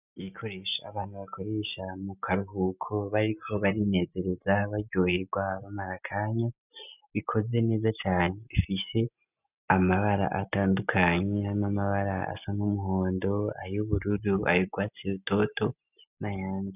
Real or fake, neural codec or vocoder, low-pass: real; none; 3.6 kHz